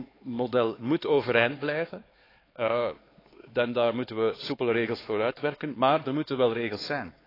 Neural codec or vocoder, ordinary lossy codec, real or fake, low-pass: codec, 16 kHz, 4 kbps, X-Codec, HuBERT features, trained on LibriSpeech; AAC, 24 kbps; fake; 5.4 kHz